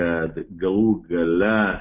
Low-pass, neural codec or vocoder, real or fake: 3.6 kHz; none; real